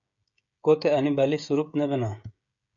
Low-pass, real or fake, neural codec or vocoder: 7.2 kHz; fake; codec, 16 kHz, 16 kbps, FreqCodec, smaller model